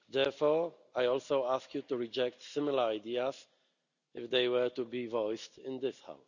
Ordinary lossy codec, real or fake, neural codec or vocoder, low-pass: none; real; none; 7.2 kHz